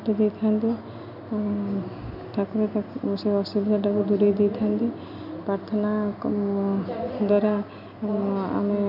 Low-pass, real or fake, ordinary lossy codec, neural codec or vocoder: 5.4 kHz; real; none; none